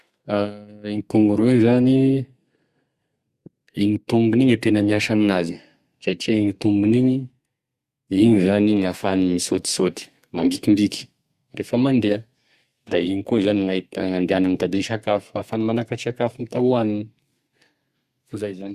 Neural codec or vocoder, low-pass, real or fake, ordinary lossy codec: codec, 32 kHz, 1.9 kbps, SNAC; 14.4 kHz; fake; Opus, 64 kbps